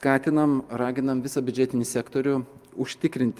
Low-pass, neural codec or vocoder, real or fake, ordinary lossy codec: 14.4 kHz; none; real; Opus, 32 kbps